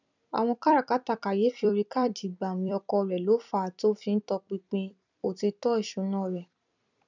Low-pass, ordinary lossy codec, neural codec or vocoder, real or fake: 7.2 kHz; none; vocoder, 44.1 kHz, 128 mel bands every 256 samples, BigVGAN v2; fake